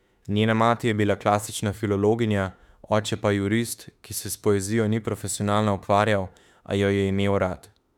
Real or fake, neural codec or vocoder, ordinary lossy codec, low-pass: fake; autoencoder, 48 kHz, 32 numbers a frame, DAC-VAE, trained on Japanese speech; none; 19.8 kHz